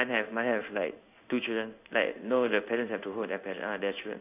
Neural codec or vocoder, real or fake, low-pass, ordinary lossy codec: codec, 16 kHz in and 24 kHz out, 1 kbps, XY-Tokenizer; fake; 3.6 kHz; none